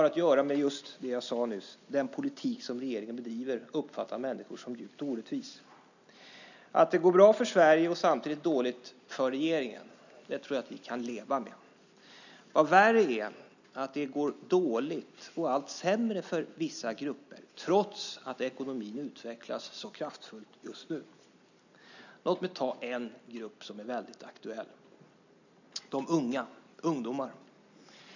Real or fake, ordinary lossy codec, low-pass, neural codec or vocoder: real; none; 7.2 kHz; none